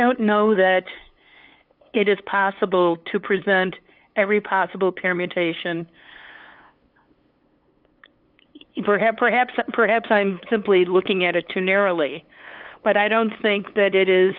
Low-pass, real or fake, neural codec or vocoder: 5.4 kHz; fake; codec, 16 kHz, 8 kbps, FunCodec, trained on LibriTTS, 25 frames a second